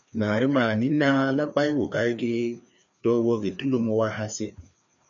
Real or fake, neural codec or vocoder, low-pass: fake; codec, 16 kHz, 2 kbps, FreqCodec, larger model; 7.2 kHz